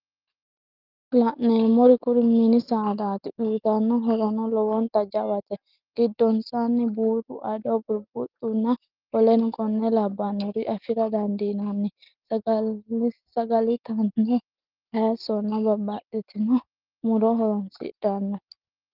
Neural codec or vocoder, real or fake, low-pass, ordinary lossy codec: none; real; 5.4 kHz; Opus, 32 kbps